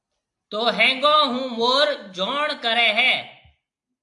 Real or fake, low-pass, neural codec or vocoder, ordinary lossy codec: real; 10.8 kHz; none; AAC, 64 kbps